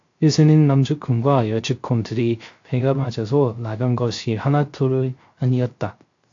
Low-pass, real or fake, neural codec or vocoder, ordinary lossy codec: 7.2 kHz; fake; codec, 16 kHz, 0.3 kbps, FocalCodec; AAC, 48 kbps